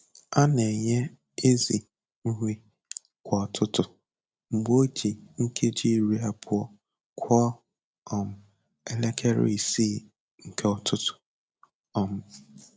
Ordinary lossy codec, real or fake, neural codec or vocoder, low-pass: none; real; none; none